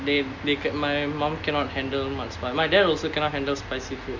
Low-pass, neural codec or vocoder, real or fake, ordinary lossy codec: 7.2 kHz; none; real; MP3, 64 kbps